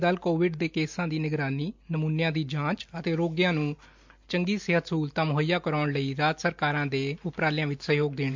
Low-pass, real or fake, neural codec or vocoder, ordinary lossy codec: 7.2 kHz; real; none; MP3, 64 kbps